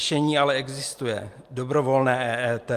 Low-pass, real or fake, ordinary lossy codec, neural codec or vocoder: 14.4 kHz; real; Opus, 32 kbps; none